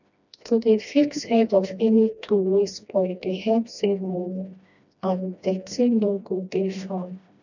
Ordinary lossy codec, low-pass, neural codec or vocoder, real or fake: none; 7.2 kHz; codec, 16 kHz, 1 kbps, FreqCodec, smaller model; fake